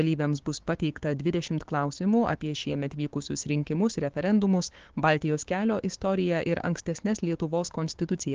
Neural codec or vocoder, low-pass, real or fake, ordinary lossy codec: codec, 16 kHz, 6 kbps, DAC; 7.2 kHz; fake; Opus, 16 kbps